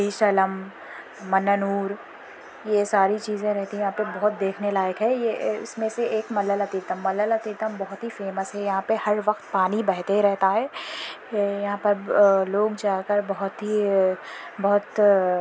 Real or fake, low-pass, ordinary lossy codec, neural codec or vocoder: real; none; none; none